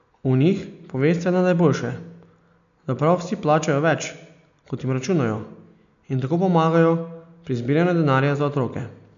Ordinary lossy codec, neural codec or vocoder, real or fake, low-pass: none; none; real; 7.2 kHz